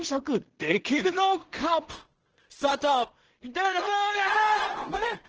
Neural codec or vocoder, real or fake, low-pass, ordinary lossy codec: codec, 16 kHz in and 24 kHz out, 0.4 kbps, LongCat-Audio-Codec, two codebook decoder; fake; 7.2 kHz; Opus, 16 kbps